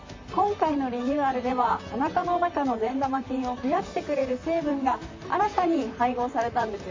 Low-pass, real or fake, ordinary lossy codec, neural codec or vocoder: 7.2 kHz; fake; MP3, 48 kbps; vocoder, 44.1 kHz, 128 mel bands, Pupu-Vocoder